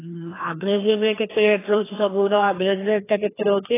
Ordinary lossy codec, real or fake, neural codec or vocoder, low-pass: AAC, 16 kbps; fake; codec, 16 kHz, 2 kbps, FreqCodec, larger model; 3.6 kHz